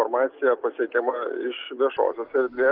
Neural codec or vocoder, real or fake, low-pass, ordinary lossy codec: none; real; 5.4 kHz; Opus, 24 kbps